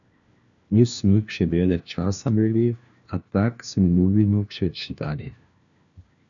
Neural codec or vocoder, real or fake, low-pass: codec, 16 kHz, 1 kbps, FunCodec, trained on LibriTTS, 50 frames a second; fake; 7.2 kHz